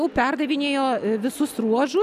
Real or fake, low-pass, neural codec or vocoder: real; 14.4 kHz; none